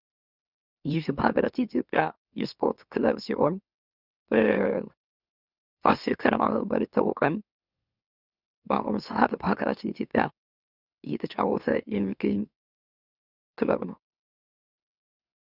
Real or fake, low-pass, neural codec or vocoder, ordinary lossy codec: fake; 5.4 kHz; autoencoder, 44.1 kHz, a latent of 192 numbers a frame, MeloTTS; Opus, 64 kbps